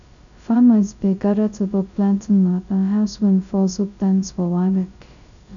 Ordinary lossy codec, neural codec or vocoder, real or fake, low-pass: none; codec, 16 kHz, 0.2 kbps, FocalCodec; fake; 7.2 kHz